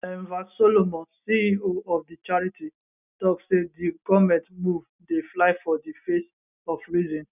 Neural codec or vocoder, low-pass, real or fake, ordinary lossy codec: none; 3.6 kHz; real; none